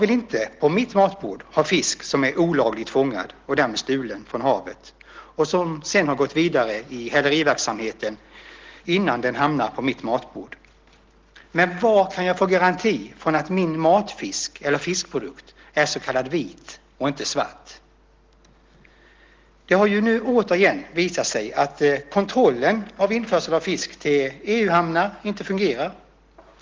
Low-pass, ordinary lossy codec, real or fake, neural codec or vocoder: 7.2 kHz; Opus, 16 kbps; real; none